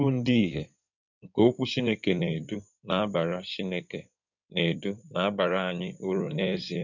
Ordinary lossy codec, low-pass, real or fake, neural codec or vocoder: none; 7.2 kHz; fake; codec, 16 kHz in and 24 kHz out, 2.2 kbps, FireRedTTS-2 codec